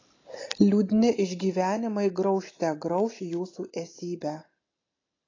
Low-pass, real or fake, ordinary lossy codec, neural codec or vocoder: 7.2 kHz; real; AAC, 32 kbps; none